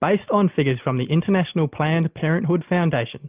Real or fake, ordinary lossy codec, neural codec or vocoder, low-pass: real; Opus, 24 kbps; none; 3.6 kHz